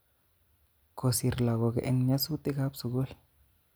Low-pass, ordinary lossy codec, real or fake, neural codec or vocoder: none; none; real; none